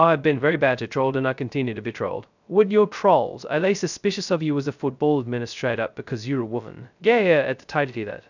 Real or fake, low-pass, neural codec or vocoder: fake; 7.2 kHz; codec, 16 kHz, 0.2 kbps, FocalCodec